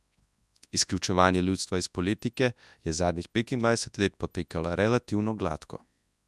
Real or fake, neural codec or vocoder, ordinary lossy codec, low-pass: fake; codec, 24 kHz, 0.9 kbps, WavTokenizer, large speech release; none; none